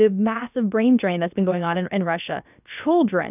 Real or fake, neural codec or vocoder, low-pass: fake; codec, 16 kHz, about 1 kbps, DyCAST, with the encoder's durations; 3.6 kHz